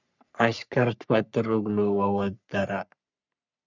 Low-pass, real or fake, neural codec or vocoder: 7.2 kHz; fake; codec, 44.1 kHz, 3.4 kbps, Pupu-Codec